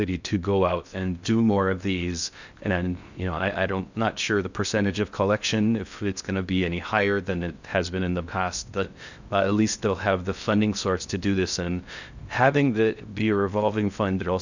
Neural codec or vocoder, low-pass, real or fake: codec, 16 kHz in and 24 kHz out, 0.6 kbps, FocalCodec, streaming, 2048 codes; 7.2 kHz; fake